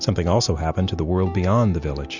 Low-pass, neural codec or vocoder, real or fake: 7.2 kHz; none; real